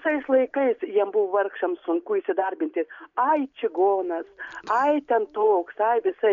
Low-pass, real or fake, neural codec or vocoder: 7.2 kHz; real; none